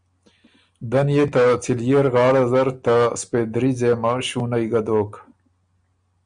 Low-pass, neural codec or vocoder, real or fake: 9.9 kHz; none; real